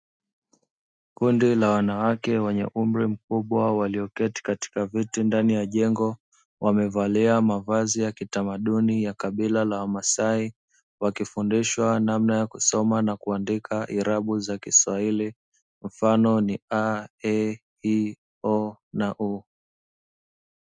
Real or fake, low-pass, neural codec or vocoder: real; 9.9 kHz; none